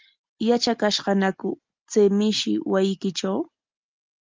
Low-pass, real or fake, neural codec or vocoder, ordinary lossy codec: 7.2 kHz; real; none; Opus, 32 kbps